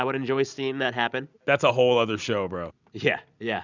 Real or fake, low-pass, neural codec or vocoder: real; 7.2 kHz; none